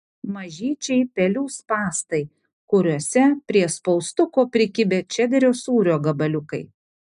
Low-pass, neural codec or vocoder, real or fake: 10.8 kHz; none; real